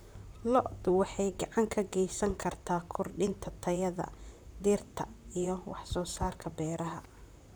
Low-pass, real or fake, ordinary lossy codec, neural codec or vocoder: none; fake; none; vocoder, 44.1 kHz, 128 mel bands, Pupu-Vocoder